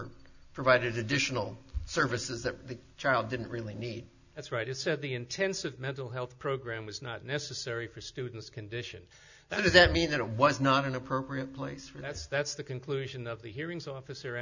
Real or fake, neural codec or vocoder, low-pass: real; none; 7.2 kHz